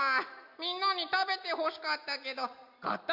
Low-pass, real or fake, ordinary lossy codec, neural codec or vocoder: 5.4 kHz; real; MP3, 48 kbps; none